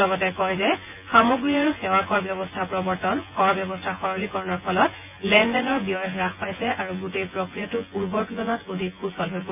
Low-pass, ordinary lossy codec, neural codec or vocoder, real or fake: 3.6 kHz; none; vocoder, 24 kHz, 100 mel bands, Vocos; fake